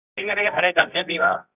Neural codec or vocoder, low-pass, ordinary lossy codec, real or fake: codec, 24 kHz, 0.9 kbps, WavTokenizer, medium music audio release; 3.6 kHz; none; fake